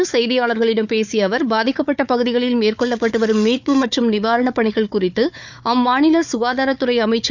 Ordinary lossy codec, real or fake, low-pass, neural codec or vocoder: none; fake; 7.2 kHz; codec, 16 kHz, 4 kbps, FunCodec, trained on Chinese and English, 50 frames a second